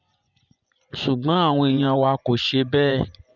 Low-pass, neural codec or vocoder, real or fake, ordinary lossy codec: 7.2 kHz; vocoder, 24 kHz, 100 mel bands, Vocos; fake; none